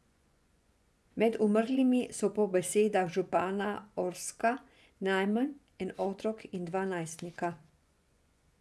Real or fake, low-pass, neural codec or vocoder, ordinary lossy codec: fake; none; vocoder, 24 kHz, 100 mel bands, Vocos; none